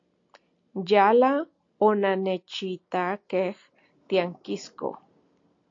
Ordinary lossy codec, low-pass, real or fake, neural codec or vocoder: AAC, 48 kbps; 7.2 kHz; real; none